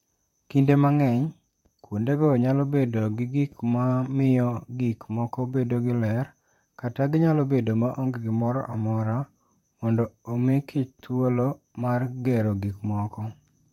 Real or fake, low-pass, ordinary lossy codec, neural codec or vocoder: fake; 19.8 kHz; MP3, 64 kbps; vocoder, 44.1 kHz, 128 mel bands, Pupu-Vocoder